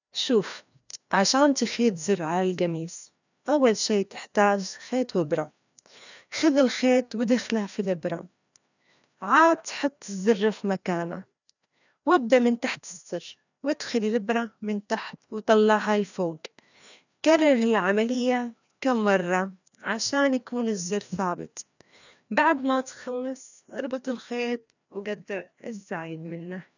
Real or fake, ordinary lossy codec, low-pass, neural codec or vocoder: fake; none; 7.2 kHz; codec, 16 kHz, 1 kbps, FreqCodec, larger model